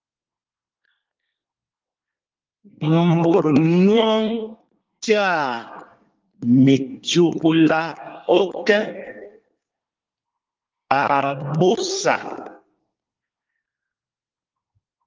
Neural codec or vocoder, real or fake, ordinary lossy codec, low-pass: codec, 24 kHz, 1 kbps, SNAC; fake; Opus, 32 kbps; 7.2 kHz